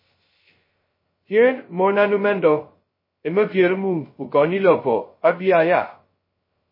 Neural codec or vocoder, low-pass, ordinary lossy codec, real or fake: codec, 16 kHz, 0.2 kbps, FocalCodec; 5.4 kHz; MP3, 24 kbps; fake